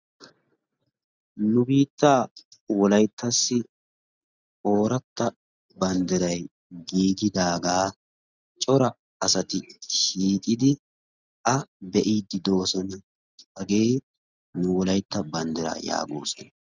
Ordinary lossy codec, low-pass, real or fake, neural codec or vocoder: Opus, 64 kbps; 7.2 kHz; real; none